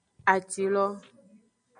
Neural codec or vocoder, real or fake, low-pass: none; real; 9.9 kHz